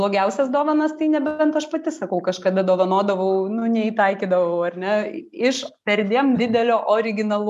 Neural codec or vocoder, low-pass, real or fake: none; 14.4 kHz; real